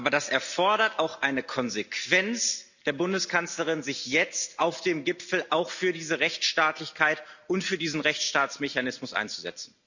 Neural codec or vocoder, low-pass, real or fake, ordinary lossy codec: none; 7.2 kHz; real; none